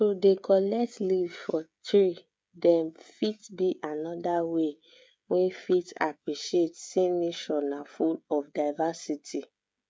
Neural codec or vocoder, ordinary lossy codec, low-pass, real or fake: codec, 16 kHz, 16 kbps, FreqCodec, smaller model; none; none; fake